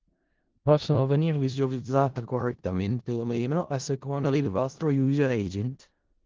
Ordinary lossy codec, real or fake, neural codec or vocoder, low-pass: Opus, 16 kbps; fake; codec, 16 kHz in and 24 kHz out, 0.4 kbps, LongCat-Audio-Codec, four codebook decoder; 7.2 kHz